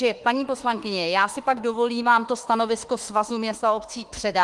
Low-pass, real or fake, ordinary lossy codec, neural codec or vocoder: 10.8 kHz; fake; Opus, 24 kbps; autoencoder, 48 kHz, 32 numbers a frame, DAC-VAE, trained on Japanese speech